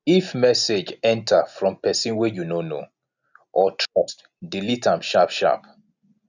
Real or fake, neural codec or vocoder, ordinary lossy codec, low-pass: real; none; none; 7.2 kHz